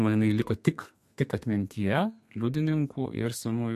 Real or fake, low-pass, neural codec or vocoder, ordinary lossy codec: fake; 14.4 kHz; codec, 32 kHz, 1.9 kbps, SNAC; MP3, 64 kbps